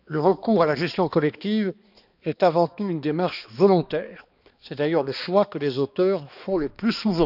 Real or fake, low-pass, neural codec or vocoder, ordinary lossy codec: fake; 5.4 kHz; codec, 16 kHz, 2 kbps, X-Codec, HuBERT features, trained on balanced general audio; none